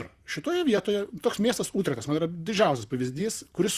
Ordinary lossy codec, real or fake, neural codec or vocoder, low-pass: Opus, 64 kbps; fake; vocoder, 44.1 kHz, 128 mel bands, Pupu-Vocoder; 14.4 kHz